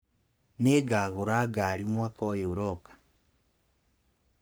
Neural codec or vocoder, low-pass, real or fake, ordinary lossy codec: codec, 44.1 kHz, 3.4 kbps, Pupu-Codec; none; fake; none